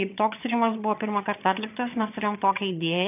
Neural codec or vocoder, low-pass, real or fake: vocoder, 22.05 kHz, 80 mel bands, HiFi-GAN; 3.6 kHz; fake